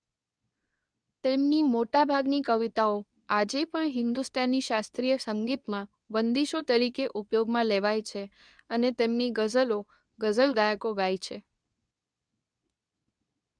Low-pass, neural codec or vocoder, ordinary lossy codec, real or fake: 9.9 kHz; codec, 24 kHz, 0.9 kbps, WavTokenizer, medium speech release version 2; none; fake